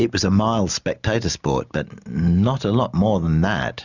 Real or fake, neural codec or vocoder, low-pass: real; none; 7.2 kHz